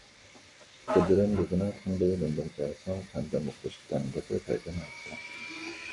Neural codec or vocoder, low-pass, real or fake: codec, 44.1 kHz, 7.8 kbps, Pupu-Codec; 10.8 kHz; fake